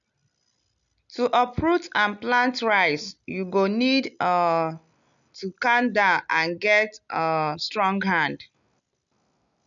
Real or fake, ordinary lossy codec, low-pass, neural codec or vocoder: real; none; 7.2 kHz; none